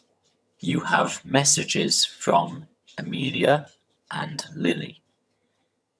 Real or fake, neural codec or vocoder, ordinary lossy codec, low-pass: fake; vocoder, 22.05 kHz, 80 mel bands, HiFi-GAN; none; none